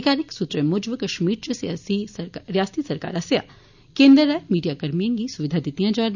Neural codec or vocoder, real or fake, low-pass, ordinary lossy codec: none; real; 7.2 kHz; none